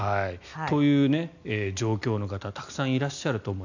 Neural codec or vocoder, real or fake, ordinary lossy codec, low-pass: none; real; none; 7.2 kHz